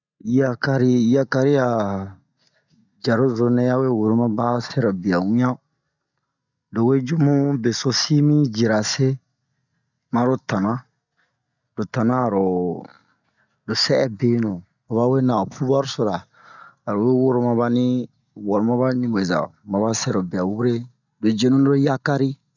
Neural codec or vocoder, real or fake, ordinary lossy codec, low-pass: none; real; none; 7.2 kHz